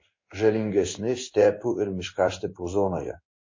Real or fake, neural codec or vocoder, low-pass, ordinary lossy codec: fake; codec, 16 kHz in and 24 kHz out, 1 kbps, XY-Tokenizer; 7.2 kHz; MP3, 32 kbps